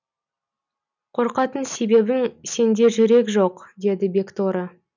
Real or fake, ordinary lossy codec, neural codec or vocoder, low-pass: real; none; none; 7.2 kHz